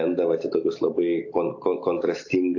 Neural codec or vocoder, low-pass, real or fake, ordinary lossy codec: none; 7.2 kHz; real; AAC, 48 kbps